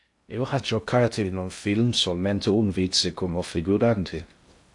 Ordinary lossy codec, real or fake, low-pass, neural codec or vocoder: AAC, 64 kbps; fake; 10.8 kHz; codec, 16 kHz in and 24 kHz out, 0.6 kbps, FocalCodec, streaming, 2048 codes